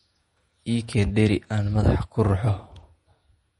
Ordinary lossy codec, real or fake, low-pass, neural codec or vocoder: MP3, 48 kbps; fake; 19.8 kHz; vocoder, 48 kHz, 128 mel bands, Vocos